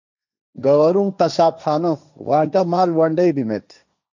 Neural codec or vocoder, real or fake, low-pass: codec, 16 kHz, 1.1 kbps, Voila-Tokenizer; fake; 7.2 kHz